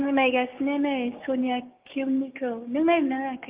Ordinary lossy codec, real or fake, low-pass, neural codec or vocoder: Opus, 32 kbps; fake; 3.6 kHz; codec, 16 kHz, 6 kbps, DAC